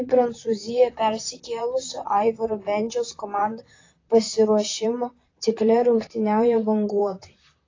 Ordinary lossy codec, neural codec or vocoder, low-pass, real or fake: AAC, 32 kbps; vocoder, 44.1 kHz, 128 mel bands, Pupu-Vocoder; 7.2 kHz; fake